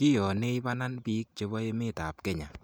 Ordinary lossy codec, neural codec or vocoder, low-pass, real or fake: none; none; none; real